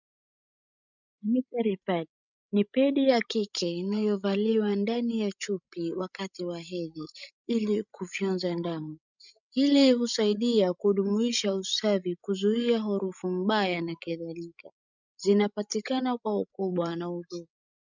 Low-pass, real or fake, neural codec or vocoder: 7.2 kHz; fake; codec, 16 kHz, 16 kbps, FreqCodec, larger model